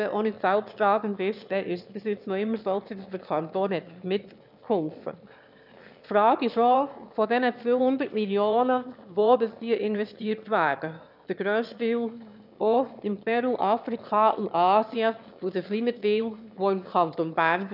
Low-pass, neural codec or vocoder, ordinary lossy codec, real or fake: 5.4 kHz; autoencoder, 22.05 kHz, a latent of 192 numbers a frame, VITS, trained on one speaker; none; fake